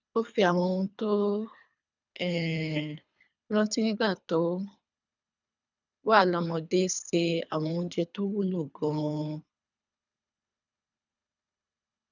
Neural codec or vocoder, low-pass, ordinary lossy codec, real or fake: codec, 24 kHz, 3 kbps, HILCodec; 7.2 kHz; none; fake